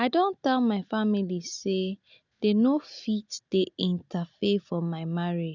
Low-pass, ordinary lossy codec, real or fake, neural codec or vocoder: 7.2 kHz; none; real; none